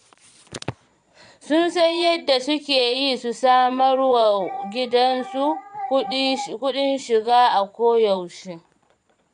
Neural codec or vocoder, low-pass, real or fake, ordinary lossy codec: vocoder, 22.05 kHz, 80 mel bands, Vocos; 9.9 kHz; fake; MP3, 96 kbps